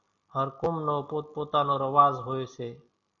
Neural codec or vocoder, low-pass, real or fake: none; 7.2 kHz; real